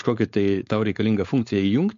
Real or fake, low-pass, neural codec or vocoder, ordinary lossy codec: fake; 7.2 kHz; codec, 16 kHz, 4.8 kbps, FACodec; MP3, 48 kbps